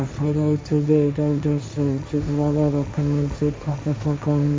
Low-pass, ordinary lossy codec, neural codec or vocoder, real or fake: none; none; codec, 16 kHz, 1.1 kbps, Voila-Tokenizer; fake